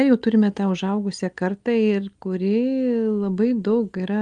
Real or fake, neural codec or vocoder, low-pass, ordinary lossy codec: real; none; 9.9 kHz; Opus, 32 kbps